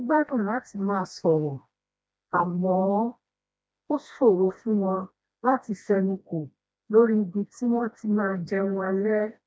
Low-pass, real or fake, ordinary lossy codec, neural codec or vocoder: none; fake; none; codec, 16 kHz, 1 kbps, FreqCodec, smaller model